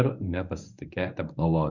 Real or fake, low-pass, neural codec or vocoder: fake; 7.2 kHz; codec, 24 kHz, 0.9 kbps, WavTokenizer, medium speech release version 1